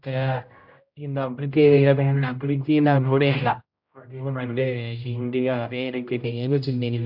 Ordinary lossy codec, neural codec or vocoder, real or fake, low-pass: none; codec, 16 kHz, 0.5 kbps, X-Codec, HuBERT features, trained on general audio; fake; 5.4 kHz